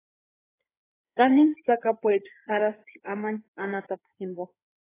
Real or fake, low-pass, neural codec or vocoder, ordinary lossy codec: fake; 3.6 kHz; codec, 16 kHz in and 24 kHz out, 2.2 kbps, FireRedTTS-2 codec; AAC, 16 kbps